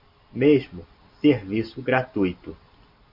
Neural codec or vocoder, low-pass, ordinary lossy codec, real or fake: none; 5.4 kHz; MP3, 48 kbps; real